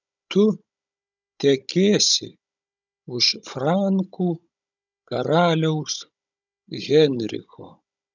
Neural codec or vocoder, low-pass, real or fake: codec, 16 kHz, 16 kbps, FunCodec, trained on Chinese and English, 50 frames a second; 7.2 kHz; fake